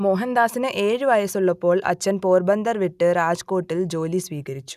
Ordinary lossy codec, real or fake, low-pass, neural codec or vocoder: none; real; 14.4 kHz; none